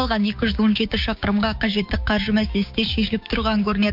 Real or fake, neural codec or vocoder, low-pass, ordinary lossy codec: fake; codec, 16 kHz, 6 kbps, DAC; 5.4 kHz; MP3, 48 kbps